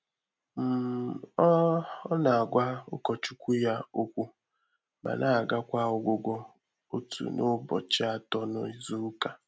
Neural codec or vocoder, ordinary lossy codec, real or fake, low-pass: none; none; real; none